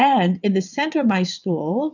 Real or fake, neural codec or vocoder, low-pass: fake; vocoder, 22.05 kHz, 80 mel bands, WaveNeXt; 7.2 kHz